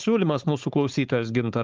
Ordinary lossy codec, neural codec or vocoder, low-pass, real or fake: Opus, 24 kbps; codec, 16 kHz, 4.8 kbps, FACodec; 7.2 kHz; fake